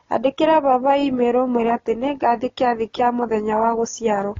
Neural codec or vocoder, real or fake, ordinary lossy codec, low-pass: none; real; AAC, 32 kbps; 7.2 kHz